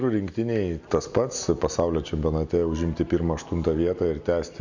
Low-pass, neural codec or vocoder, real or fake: 7.2 kHz; none; real